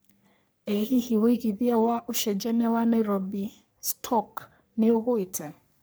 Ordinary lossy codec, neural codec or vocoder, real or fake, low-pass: none; codec, 44.1 kHz, 3.4 kbps, Pupu-Codec; fake; none